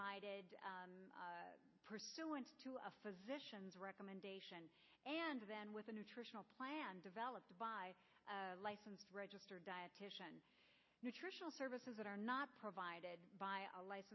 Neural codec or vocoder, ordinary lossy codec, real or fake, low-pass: none; MP3, 24 kbps; real; 7.2 kHz